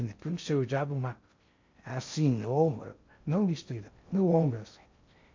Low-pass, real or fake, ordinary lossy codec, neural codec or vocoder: 7.2 kHz; fake; MP3, 64 kbps; codec, 16 kHz in and 24 kHz out, 0.6 kbps, FocalCodec, streaming, 2048 codes